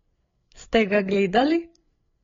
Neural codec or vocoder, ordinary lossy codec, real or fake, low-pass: codec, 16 kHz, 8 kbps, FreqCodec, larger model; AAC, 32 kbps; fake; 7.2 kHz